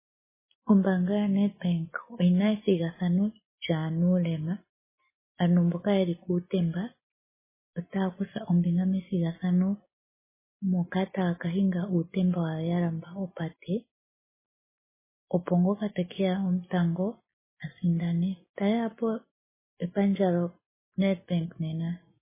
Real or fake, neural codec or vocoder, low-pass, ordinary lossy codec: real; none; 3.6 kHz; MP3, 16 kbps